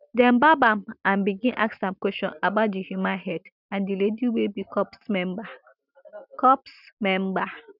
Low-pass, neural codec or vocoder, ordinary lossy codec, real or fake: 5.4 kHz; none; none; real